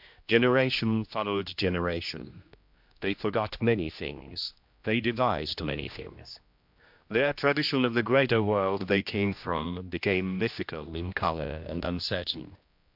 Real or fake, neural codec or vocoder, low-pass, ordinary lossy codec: fake; codec, 16 kHz, 1 kbps, X-Codec, HuBERT features, trained on general audio; 5.4 kHz; MP3, 48 kbps